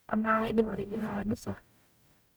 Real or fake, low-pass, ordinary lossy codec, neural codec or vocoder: fake; none; none; codec, 44.1 kHz, 0.9 kbps, DAC